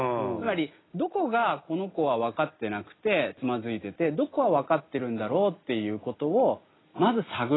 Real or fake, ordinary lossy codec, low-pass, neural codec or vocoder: real; AAC, 16 kbps; 7.2 kHz; none